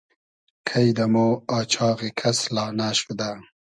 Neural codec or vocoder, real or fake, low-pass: none; real; 9.9 kHz